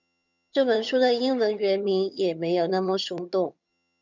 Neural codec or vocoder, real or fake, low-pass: vocoder, 22.05 kHz, 80 mel bands, HiFi-GAN; fake; 7.2 kHz